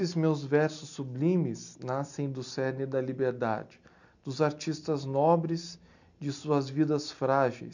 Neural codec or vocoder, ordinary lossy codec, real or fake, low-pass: none; none; real; 7.2 kHz